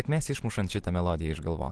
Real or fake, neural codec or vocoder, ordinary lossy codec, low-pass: real; none; Opus, 16 kbps; 10.8 kHz